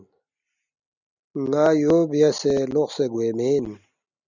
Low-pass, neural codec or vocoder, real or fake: 7.2 kHz; none; real